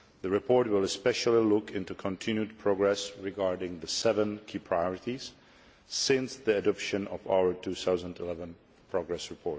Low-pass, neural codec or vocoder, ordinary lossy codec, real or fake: none; none; none; real